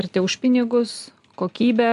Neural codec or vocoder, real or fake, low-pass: none; real; 10.8 kHz